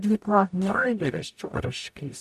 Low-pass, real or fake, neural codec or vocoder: 14.4 kHz; fake; codec, 44.1 kHz, 0.9 kbps, DAC